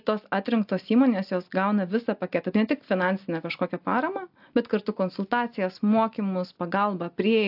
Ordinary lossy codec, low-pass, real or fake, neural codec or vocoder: AAC, 48 kbps; 5.4 kHz; real; none